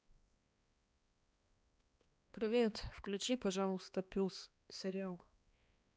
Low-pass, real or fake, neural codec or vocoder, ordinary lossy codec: none; fake; codec, 16 kHz, 2 kbps, X-Codec, HuBERT features, trained on balanced general audio; none